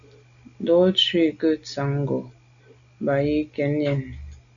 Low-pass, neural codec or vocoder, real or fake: 7.2 kHz; none; real